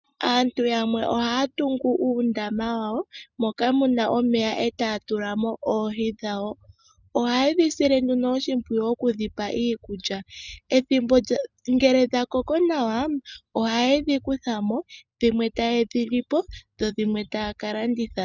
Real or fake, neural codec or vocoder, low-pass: real; none; 7.2 kHz